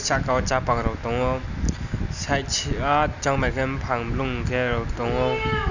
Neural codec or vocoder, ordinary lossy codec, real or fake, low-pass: none; none; real; 7.2 kHz